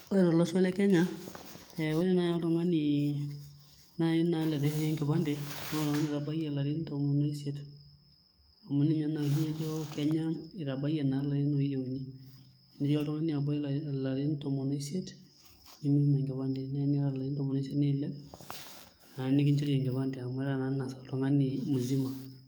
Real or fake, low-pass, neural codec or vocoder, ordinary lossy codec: fake; none; codec, 44.1 kHz, 7.8 kbps, DAC; none